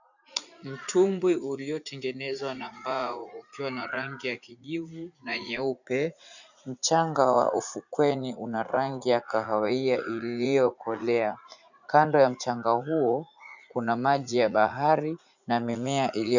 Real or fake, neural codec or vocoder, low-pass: fake; vocoder, 44.1 kHz, 80 mel bands, Vocos; 7.2 kHz